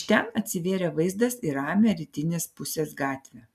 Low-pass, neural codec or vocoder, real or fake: 14.4 kHz; none; real